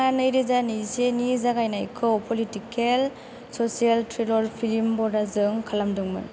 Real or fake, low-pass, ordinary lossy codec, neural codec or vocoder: real; none; none; none